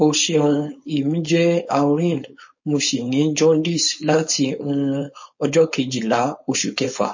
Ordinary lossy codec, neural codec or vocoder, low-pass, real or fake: MP3, 32 kbps; codec, 16 kHz, 4.8 kbps, FACodec; 7.2 kHz; fake